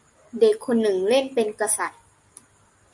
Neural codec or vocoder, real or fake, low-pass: none; real; 10.8 kHz